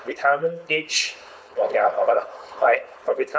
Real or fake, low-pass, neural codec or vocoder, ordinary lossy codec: fake; none; codec, 16 kHz, 4.8 kbps, FACodec; none